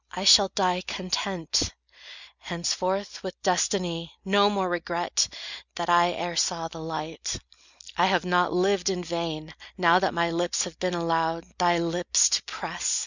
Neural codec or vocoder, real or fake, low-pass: none; real; 7.2 kHz